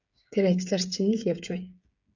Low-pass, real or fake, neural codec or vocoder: 7.2 kHz; fake; codec, 16 kHz, 8 kbps, FreqCodec, smaller model